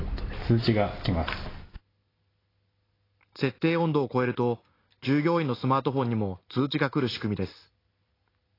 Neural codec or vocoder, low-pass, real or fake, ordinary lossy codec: none; 5.4 kHz; real; AAC, 24 kbps